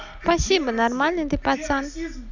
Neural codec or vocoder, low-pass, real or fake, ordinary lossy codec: none; 7.2 kHz; real; none